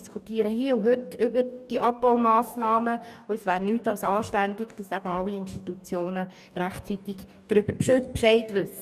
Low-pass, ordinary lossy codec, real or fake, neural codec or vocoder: 14.4 kHz; none; fake; codec, 44.1 kHz, 2.6 kbps, DAC